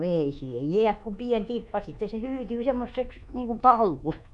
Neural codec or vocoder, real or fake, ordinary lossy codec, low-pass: codec, 24 kHz, 1.2 kbps, DualCodec; fake; none; 10.8 kHz